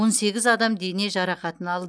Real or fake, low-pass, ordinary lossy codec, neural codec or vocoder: real; none; none; none